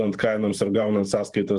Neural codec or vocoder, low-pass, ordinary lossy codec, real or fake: vocoder, 44.1 kHz, 128 mel bands every 512 samples, BigVGAN v2; 10.8 kHz; Opus, 32 kbps; fake